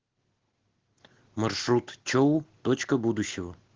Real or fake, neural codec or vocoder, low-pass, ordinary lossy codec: real; none; 7.2 kHz; Opus, 16 kbps